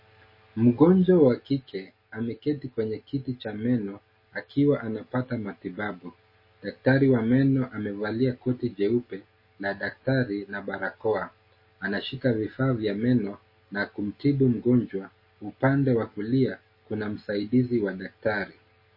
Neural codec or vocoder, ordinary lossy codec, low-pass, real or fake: none; MP3, 24 kbps; 5.4 kHz; real